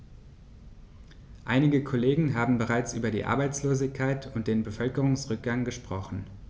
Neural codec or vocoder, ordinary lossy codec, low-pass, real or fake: none; none; none; real